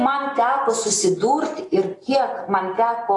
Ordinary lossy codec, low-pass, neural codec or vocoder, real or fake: AAC, 32 kbps; 10.8 kHz; none; real